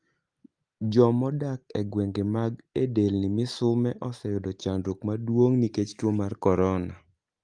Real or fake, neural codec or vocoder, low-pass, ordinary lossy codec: real; none; 9.9 kHz; Opus, 32 kbps